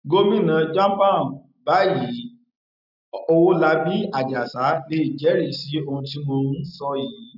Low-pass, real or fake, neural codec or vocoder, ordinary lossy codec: 5.4 kHz; real; none; none